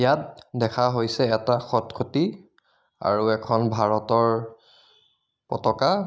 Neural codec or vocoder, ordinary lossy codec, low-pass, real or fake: none; none; none; real